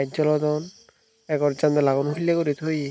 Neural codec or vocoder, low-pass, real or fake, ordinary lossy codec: none; none; real; none